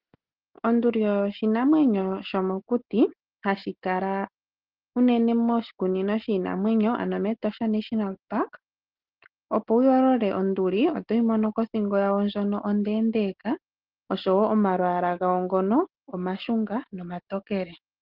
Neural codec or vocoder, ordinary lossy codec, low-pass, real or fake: none; Opus, 16 kbps; 5.4 kHz; real